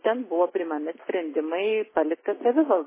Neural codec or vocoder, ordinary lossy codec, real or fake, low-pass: none; MP3, 16 kbps; real; 3.6 kHz